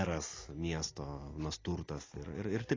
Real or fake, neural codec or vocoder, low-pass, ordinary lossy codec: real; none; 7.2 kHz; AAC, 32 kbps